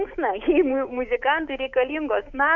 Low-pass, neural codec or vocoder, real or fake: 7.2 kHz; codec, 16 kHz, 6 kbps, DAC; fake